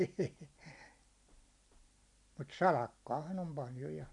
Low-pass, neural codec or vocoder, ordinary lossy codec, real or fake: 10.8 kHz; none; none; real